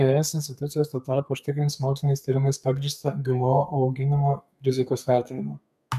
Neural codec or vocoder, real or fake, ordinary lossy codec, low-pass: codec, 32 kHz, 1.9 kbps, SNAC; fake; MP3, 96 kbps; 14.4 kHz